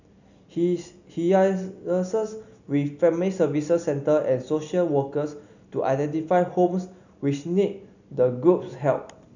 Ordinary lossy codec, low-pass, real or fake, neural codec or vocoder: none; 7.2 kHz; real; none